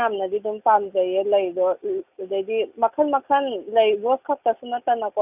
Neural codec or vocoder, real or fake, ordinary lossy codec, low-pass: none; real; none; 3.6 kHz